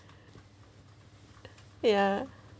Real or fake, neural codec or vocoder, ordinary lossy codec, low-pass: real; none; none; none